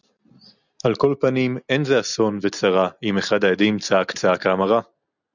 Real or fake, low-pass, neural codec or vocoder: real; 7.2 kHz; none